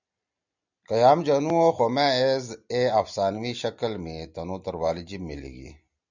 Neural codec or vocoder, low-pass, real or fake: none; 7.2 kHz; real